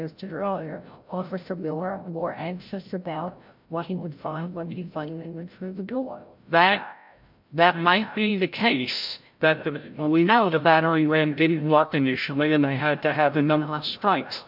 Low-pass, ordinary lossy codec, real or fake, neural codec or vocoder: 5.4 kHz; MP3, 48 kbps; fake; codec, 16 kHz, 0.5 kbps, FreqCodec, larger model